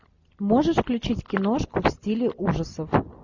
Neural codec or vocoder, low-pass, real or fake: none; 7.2 kHz; real